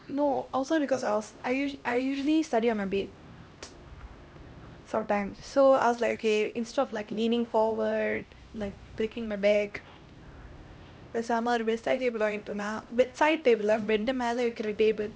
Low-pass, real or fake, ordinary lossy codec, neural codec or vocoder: none; fake; none; codec, 16 kHz, 1 kbps, X-Codec, HuBERT features, trained on LibriSpeech